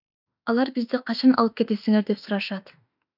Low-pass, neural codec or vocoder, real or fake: 5.4 kHz; autoencoder, 48 kHz, 32 numbers a frame, DAC-VAE, trained on Japanese speech; fake